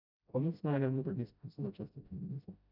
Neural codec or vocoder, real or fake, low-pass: codec, 16 kHz, 0.5 kbps, FreqCodec, smaller model; fake; 5.4 kHz